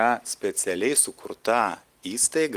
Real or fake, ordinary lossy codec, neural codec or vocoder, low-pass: real; Opus, 24 kbps; none; 14.4 kHz